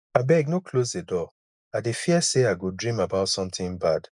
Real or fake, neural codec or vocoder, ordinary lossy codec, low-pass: real; none; none; 10.8 kHz